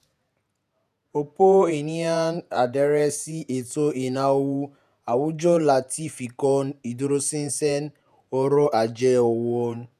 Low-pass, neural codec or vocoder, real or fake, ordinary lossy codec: 14.4 kHz; vocoder, 48 kHz, 128 mel bands, Vocos; fake; none